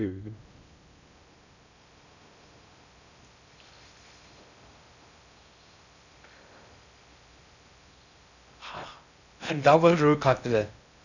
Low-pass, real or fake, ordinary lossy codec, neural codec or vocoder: 7.2 kHz; fake; none; codec, 16 kHz in and 24 kHz out, 0.6 kbps, FocalCodec, streaming, 2048 codes